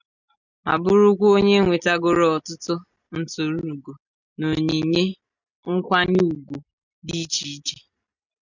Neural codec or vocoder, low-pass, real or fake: none; 7.2 kHz; real